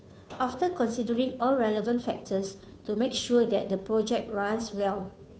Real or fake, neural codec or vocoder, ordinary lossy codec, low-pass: fake; codec, 16 kHz, 2 kbps, FunCodec, trained on Chinese and English, 25 frames a second; none; none